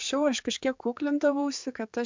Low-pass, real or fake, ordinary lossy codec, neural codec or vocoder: 7.2 kHz; fake; MP3, 64 kbps; codec, 16 kHz, 4 kbps, X-Codec, HuBERT features, trained on general audio